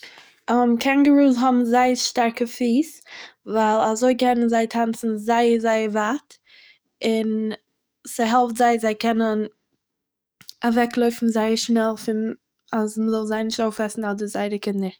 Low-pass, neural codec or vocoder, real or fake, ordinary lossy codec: none; codec, 44.1 kHz, 7.8 kbps, DAC; fake; none